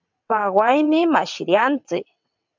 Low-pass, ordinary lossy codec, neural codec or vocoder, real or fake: 7.2 kHz; MP3, 64 kbps; vocoder, 22.05 kHz, 80 mel bands, WaveNeXt; fake